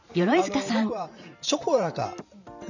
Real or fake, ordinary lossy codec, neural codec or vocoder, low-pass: fake; none; vocoder, 44.1 kHz, 128 mel bands every 512 samples, BigVGAN v2; 7.2 kHz